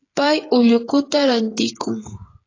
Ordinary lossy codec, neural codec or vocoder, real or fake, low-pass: AAC, 32 kbps; codec, 16 kHz, 8 kbps, FreqCodec, smaller model; fake; 7.2 kHz